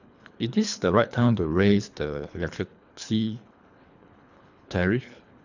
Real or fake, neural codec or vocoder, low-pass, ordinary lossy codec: fake; codec, 24 kHz, 3 kbps, HILCodec; 7.2 kHz; none